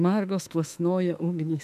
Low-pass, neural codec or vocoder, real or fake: 14.4 kHz; autoencoder, 48 kHz, 32 numbers a frame, DAC-VAE, trained on Japanese speech; fake